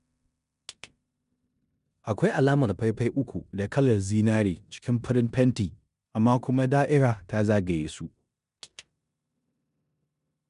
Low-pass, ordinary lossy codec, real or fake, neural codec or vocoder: 10.8 kHz; MP3, 96 kbps; fake; codec, 16 kHz in and 24 kHz out, 0.9 kbps, LongCat-Audio-Codec, four codebook decoder